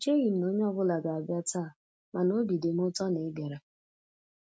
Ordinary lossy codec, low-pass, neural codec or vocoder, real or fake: none; none; none; real